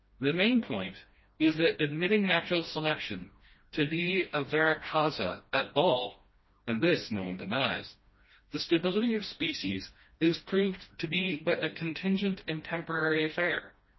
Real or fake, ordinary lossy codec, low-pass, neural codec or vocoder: fake; MP3, 24 kbps; 7.2 kHz; codec, 16 kHz, 1 kbps, FreqCodec, smaller model